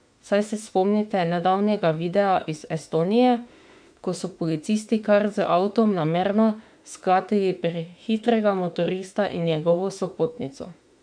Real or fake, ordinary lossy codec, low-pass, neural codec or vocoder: fake; MP3, 64 kbps; 9.9 kHz; autoencoder, 48 kHz, 32 numbers a frame, DAC-VAE, trained on Japanese speech